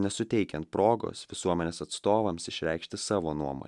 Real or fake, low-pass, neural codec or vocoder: real; 10.8 kHz; none